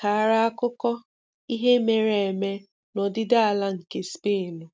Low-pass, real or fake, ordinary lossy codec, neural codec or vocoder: none; real; none; none